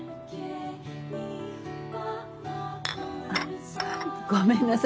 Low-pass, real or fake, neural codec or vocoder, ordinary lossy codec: none; real; none; none